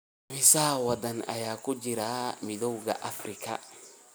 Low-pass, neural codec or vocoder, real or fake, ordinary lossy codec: none; none; real; none